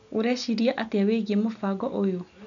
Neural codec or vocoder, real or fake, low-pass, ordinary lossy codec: none; real; 7.2 kHz; none